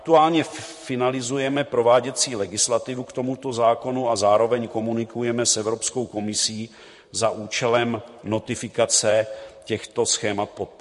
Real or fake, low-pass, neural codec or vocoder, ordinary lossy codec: fake; 14.4 kHz; vocoder, 44.1 kHz, 128 mel bands, Pupu-Vocoder; MP3, 48 kbps